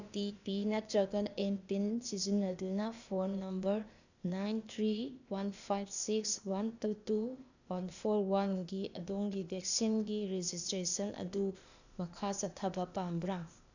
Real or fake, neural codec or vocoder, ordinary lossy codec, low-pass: fake; codec, 16 kHz, 0.8 kbps, ZipCodec; none; 7.2 kHz